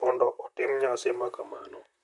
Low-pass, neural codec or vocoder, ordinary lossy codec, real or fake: 10.8 kHz; vocoder, 44.1 kHz, 128 mel bands, Pupu-Vocoder; none; fake